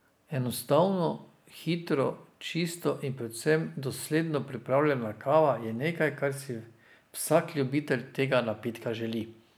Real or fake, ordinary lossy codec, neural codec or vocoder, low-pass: real; none; none; none